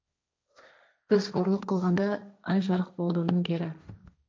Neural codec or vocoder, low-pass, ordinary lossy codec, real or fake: codec, 16 kHz, 1.1 kbps, Voila-Tokenizer; none; none; fake